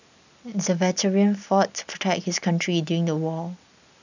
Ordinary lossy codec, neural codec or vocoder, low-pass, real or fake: none; none; 7.2 kHz; real